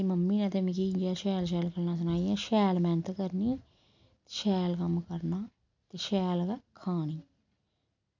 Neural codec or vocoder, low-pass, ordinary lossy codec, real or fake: none; 7.2 kHz; MP3, 64 kbps; real